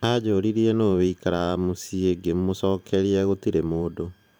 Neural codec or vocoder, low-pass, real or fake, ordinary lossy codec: vocoder, 44.1 kHz, 128 mel bands every 512 samples, BigVGAN v2; none; fake; none